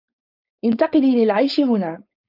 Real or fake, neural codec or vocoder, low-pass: fake; codec, 16 kHz, 4.8 kbps, FACodec; 5.4 kHz